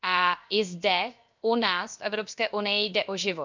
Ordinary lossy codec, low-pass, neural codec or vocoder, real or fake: MP3, 64 kbps; 7.2 kHz; codec, 16 kHz, 0.7 kbps, FocalCodec; fake